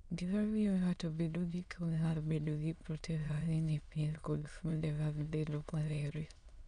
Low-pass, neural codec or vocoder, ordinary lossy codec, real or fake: 9.9 kHz; autoencoder, 22.05 kHz, a latent of 192 numbers a frame, VITS, trained on many speakers; none; fake